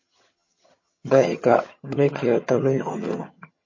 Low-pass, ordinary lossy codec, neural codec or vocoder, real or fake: 7.2 kHz; MP3, 32 kbps; vocoder, 22.05 kHz, 80 mel bands, HiFi-GAN; fake